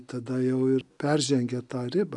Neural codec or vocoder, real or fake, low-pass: none; real; 10.8 kHz